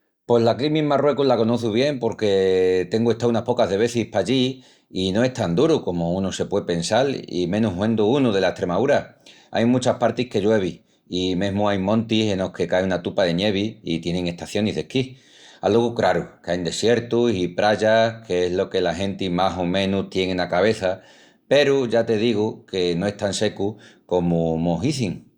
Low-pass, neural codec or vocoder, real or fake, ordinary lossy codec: 19.8 kHz; none; real; Opus, 64 kbps